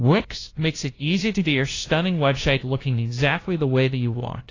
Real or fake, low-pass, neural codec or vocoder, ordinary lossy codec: fake; 7.2 kHz; codec, 16 kHz, 0.5 kbps, FunCodec, trained on LibriTTS, 25 frames a second; AAC, 32 kbps